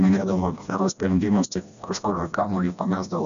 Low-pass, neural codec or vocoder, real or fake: 7.2 kHz; codec, 16 kHz, 1 kbps, FreqCodec, smaller model; fake